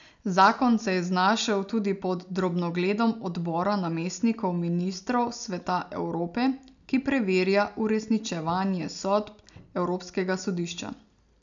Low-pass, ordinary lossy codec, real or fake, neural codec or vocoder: 7.2 kHz; none; real; none